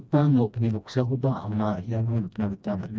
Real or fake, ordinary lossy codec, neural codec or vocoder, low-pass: fake; none; codec, 16 kHz, 1 kbps, FreqCodec, smaller model; none